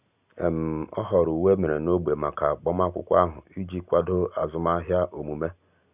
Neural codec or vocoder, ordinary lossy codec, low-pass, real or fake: none; none; 3.6 kHz; real